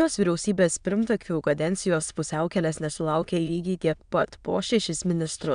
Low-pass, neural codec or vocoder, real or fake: 9.9 kHz; autoencoder, 22.05 kHz, a latent of 192 numbers a frame, VITS, trained on many speakers; fake